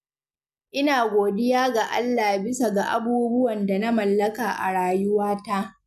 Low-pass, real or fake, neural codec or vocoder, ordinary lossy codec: 14.4 kHz; real; none; none